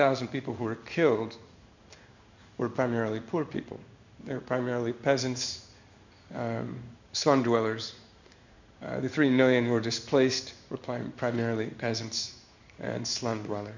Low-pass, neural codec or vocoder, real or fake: 7.2 kHz; codec, 16 kHz in and 24 kHz out, 1 kbps, XY-Tokenizer; fake